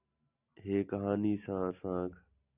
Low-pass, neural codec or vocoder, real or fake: 3.6 kHz; none; real